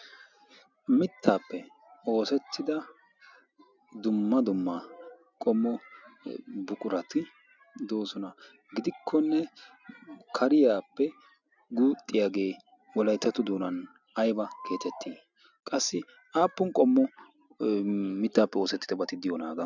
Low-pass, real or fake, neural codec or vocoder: 7.2 kHz; real; none